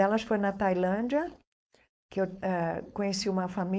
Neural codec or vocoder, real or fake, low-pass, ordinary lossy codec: codec, 16 kHz, 4.8 kbps, FACodec; fake; none; none